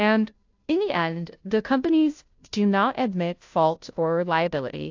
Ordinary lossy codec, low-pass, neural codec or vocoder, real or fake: AAC, 48 kbps; 7.2 kHz; codec, 16 kHz, 0.5 kbps, FunCodec, trained on Chinese and English, 25 frames a second; fake